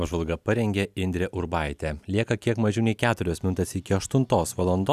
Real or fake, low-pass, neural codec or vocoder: real; 14.4 kHz; none